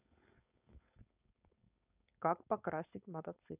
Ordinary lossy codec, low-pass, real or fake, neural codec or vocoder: none; 3.6 kHz; fake; codec, 16 kHz, 4.8 kbps, FACodec